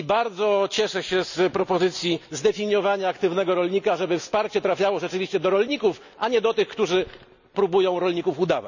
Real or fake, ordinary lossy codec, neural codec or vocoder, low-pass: real; none; none; 7.2 kHz